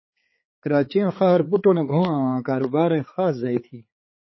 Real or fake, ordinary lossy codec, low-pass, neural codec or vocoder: fake; MP3, 24 kbps; 7.2 kHz; codec, 16 kHz, 4 kbps, X-Codec, HuBERT features, trained on balanced general audio